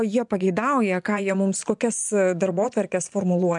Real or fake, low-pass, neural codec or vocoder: fake; 10.8 kHz; vocoder, 24 kHz, 100 mel bands, Vocos